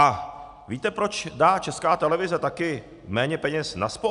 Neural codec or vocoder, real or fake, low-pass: none; real; 10.8 kHz